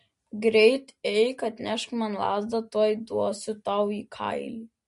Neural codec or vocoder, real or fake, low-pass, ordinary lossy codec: none; real; 10.8 kHz; MP3, 48 kbps